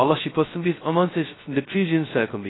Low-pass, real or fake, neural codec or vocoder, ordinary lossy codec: 7.2 kHz; fake; codec, 16 kHz, 0.2 kbps, FocalCodec; AAC, 16 kbps